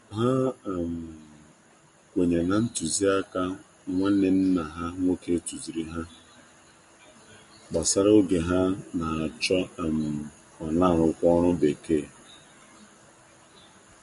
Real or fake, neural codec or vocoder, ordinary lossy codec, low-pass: real; none; MP3, 48 kbps; 14.4 kHz